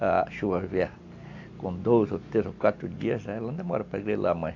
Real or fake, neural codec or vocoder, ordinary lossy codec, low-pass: real; none; AAC, 48 kbps; 7.2 kHz